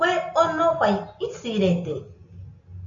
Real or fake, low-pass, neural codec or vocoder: real; 7.2 kHz; none